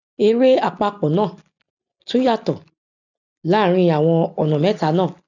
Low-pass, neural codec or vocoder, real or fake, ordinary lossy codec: 7.2 kHz; none; real; none